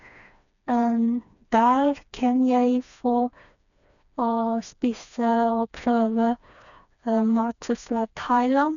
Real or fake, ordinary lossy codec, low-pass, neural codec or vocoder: fake; none; 7.2 kHz; codec, 16 kHz, 2 kbps, FreqCodec, smaller model